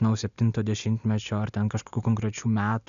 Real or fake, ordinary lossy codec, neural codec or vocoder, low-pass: real; AAC, 96 kbps; none; 7.2 kHz